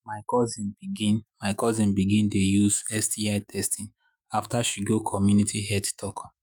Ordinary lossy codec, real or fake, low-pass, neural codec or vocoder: none; fake; none; autoencoder, 48 kHz, 128 numbers a frame, DAC-VAE, trained on Japanese speech